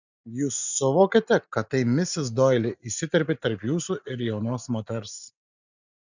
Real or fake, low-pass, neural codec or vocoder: real; 7.2 kHz; none